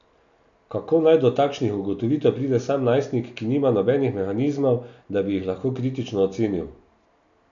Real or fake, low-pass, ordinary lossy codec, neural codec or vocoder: real; 7.2 kHz; none; none